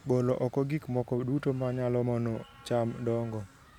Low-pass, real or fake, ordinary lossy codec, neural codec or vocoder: 19.8 kHz; real; none; none